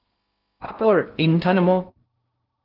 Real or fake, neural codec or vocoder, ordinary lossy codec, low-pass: fake; codec, 16 kHz in and 24 kHz out, 0.6 kbps, FocalCodec, streaming, 2048 codes; Opus, 24 kbps; 5.4 kHz